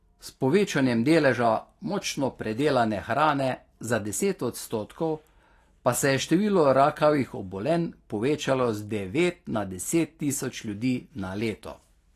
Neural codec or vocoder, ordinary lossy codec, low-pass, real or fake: none; AAC, 48 kbps; 14.4 kHz; real